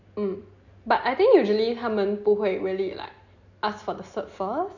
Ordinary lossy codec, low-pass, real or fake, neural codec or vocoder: none; 7.2 kHz; real; none